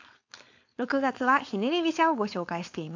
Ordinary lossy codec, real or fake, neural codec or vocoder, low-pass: AAC, 48 kbps; fake; codec, 16 kHz, 4.8 kbps, FACodec; 7.2 kHz